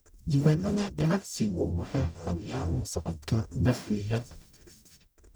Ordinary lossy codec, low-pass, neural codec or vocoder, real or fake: none; none; codec, 44.1 kHz, 0.9 kbps, DAC; fake